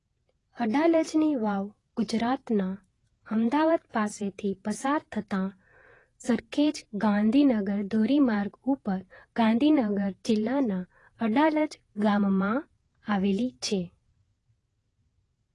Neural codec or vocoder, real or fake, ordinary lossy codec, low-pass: vocoder, 48 kHz, 128 mel bands, Vocos; fake; AAC, 32 kbps; 10.8 kHz